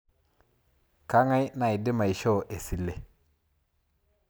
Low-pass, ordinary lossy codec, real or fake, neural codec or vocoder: none; none; real; none